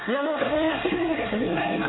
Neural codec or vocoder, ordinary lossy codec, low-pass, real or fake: codec, 24 kHz, 1 kbps, SNAC; AAC, 16 kbps; 7.2 kHz; fake